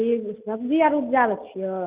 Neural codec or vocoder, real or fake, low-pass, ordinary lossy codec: none; real; 3.6 kHz; Opus, 24 kbps